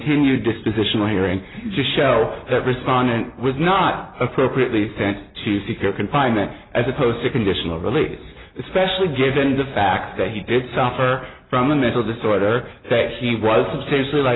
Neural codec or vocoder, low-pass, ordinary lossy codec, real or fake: none; 7.2 kHz; AAC, 16 kbps; real